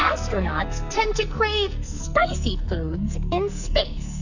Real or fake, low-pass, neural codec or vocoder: fake; 7.2 kHz; codec, 44.1 kHz, 2.6 kbps, SNAC